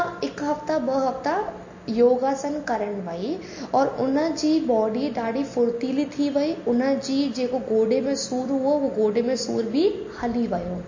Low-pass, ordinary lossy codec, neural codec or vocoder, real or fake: 7.2 kHz; MP3, 32 kbps; none; real